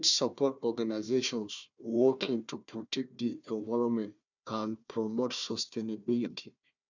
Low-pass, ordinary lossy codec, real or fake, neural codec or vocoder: 7.2 kHz; none; fake; codec, 16 kHz, 1 kbps, FunCodec, trained on Chinese and English, 50 frames a second